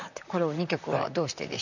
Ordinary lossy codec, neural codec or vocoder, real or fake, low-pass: none; vocoder, 44.1 kHz, 128 mel bands, Pupu-Vocoder; fake; 7.2 kHz